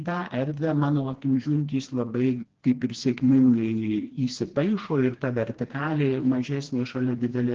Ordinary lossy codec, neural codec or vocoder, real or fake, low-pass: Opus, 16 kbps; codec, 16 kHz, 2 kbps, FreqCodec, smaller model; fake; 7.2 kHz